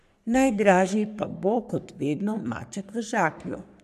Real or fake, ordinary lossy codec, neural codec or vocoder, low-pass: fake; none; codec, 44.1 kHz, 3.4 kbps, Pupu-Codec; 14.4 kHz